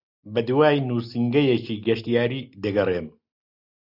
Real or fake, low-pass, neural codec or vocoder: real; 5.4 kHz; none